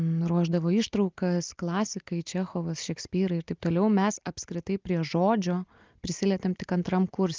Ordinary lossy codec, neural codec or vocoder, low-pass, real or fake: Opus, 32 kbps; none; 7.2 kHz; real